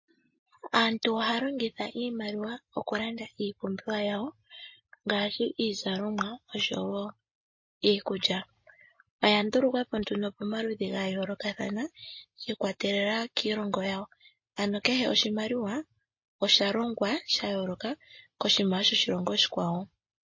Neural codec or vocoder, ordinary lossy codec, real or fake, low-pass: none; MP3, 32 kbps; real; 7.2 kHz